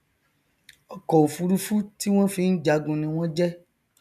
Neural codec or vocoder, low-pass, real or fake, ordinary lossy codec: none; 14.4 kHz; real; none